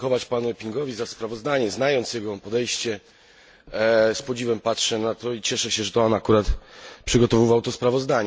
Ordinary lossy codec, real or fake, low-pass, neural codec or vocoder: none; real; none; none